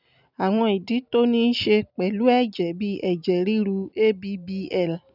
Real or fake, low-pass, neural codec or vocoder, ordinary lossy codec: real; 5.4 kHz; none; none